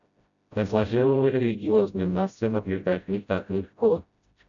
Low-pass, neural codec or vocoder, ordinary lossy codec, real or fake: 7.2 kHz; codec, 16 kHz, 0.5 kbps, FreqCodec, smaller model; MP3, 96 kbps; fake